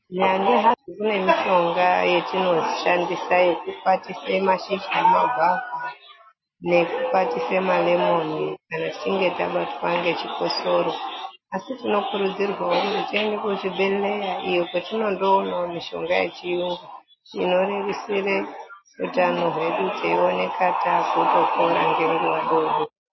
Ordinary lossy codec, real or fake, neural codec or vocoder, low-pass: MP3, 24 kbps; real; none; 7.2 kHz